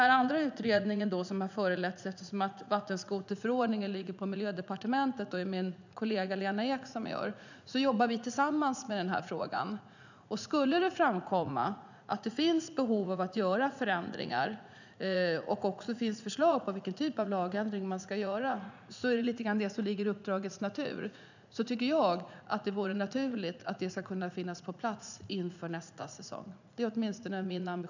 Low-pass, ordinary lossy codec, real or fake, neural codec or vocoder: 7.2 kHz; none; fake; vocoder, 44.1 kHz, 80 mel bands, Vocos